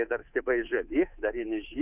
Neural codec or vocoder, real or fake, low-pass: codec, 24 kHz, 3.1 kbps, DualCodec; fake; 3.6 kHz